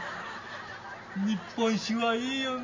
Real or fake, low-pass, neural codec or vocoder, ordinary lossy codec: real; 7.2 kHz; none; MP3, 32 kbps